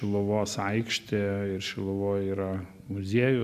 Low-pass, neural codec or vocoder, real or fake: 14.4 kHz; none; real